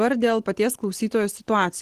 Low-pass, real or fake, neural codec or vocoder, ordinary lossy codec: 14.4 kHz; real; none; Opus, 16 kbps